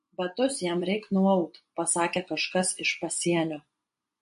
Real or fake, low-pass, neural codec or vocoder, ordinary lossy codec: fake; 10.8 kHz; vocoder, 24 kHz, 100 mel bands, Vocos; MP3, 48 kbps